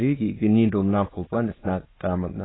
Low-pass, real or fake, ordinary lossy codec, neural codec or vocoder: 7.2 kHz; fake; AAC, 16 kbps; autoencoder, 22.05 kHz, a latent of 192 numbers a frame, VITS, trained on many speakers